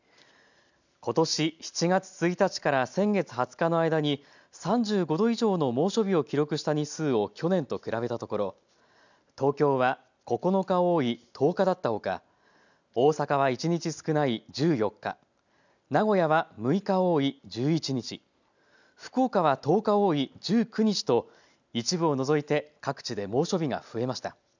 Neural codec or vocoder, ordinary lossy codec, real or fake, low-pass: none; none; real; 7.2 kHz